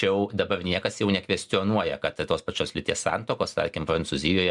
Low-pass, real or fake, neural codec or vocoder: 10.8 kHz; real; none